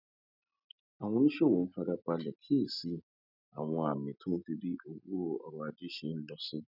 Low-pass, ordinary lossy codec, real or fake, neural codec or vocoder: 5.4 kHz; none; real; none